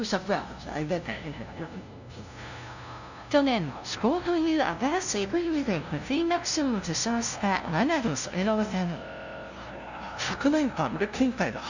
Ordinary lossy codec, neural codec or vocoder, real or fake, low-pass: none; codec, 16 kHz, 0.5 kbps, FunCodec, trained on LibriTTS, 25 frames a second; fake; 7.2 kHz